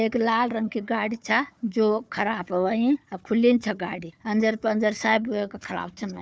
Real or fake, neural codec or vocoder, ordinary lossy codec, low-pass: fake; codec, 16 kHz, 4 kbps, FunCodec, trained on Chinese and English, 50 frames a second; none; none